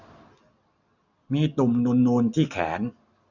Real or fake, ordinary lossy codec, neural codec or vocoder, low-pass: real; none; none; 7.2 kHz